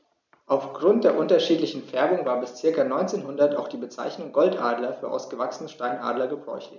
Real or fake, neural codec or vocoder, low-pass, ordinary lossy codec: real; none; 7.2 kHz; none